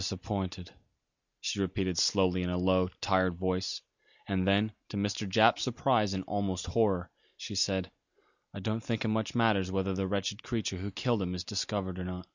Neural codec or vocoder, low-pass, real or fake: none; 7.2 kHz; real